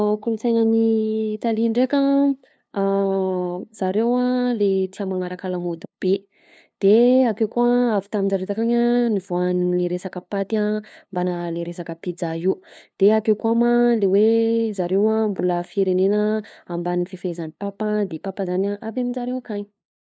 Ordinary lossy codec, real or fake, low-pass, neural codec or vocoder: none; fake; none; codec, 16 kHz, 2 kbps, FunCodec, trained on LibriTTS, 25 frames a second